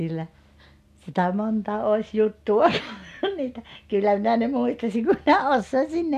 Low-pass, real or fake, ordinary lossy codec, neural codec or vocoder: 14.4 kHz; real; none; none